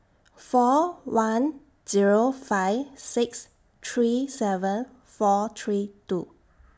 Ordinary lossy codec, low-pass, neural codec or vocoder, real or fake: none; none; none; real